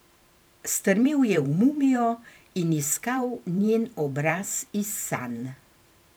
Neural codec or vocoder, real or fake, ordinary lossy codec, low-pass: vocoder, 44.1 kHz, 128 mel bands every 512 samples, BigVGAN v2; fake; none; none